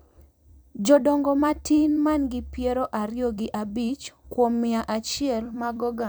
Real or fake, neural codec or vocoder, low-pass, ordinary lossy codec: fake; vocoder, 44.1 kHz, 128 mel bands every 256 samples, BigVGAN v2; none; none